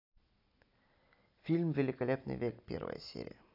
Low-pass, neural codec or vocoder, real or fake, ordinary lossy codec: 5.4 kHz; none; real; AAC, 32 kbps